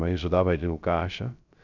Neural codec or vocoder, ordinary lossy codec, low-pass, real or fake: codec, 16 kHz, 0.3 kbps, FocalCodec; none; 7.2 kHz; fake